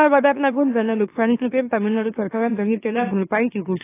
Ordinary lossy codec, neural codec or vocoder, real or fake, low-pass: AAC, 16 kbps; autoencoder, 44.1 kHz, a latent of 192 numbers a frame, MeloTTS; fake; 3.6 kHz